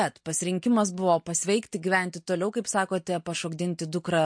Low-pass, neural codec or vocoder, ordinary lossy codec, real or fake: 9.9 kHz; none; MP3, 48 kbps; real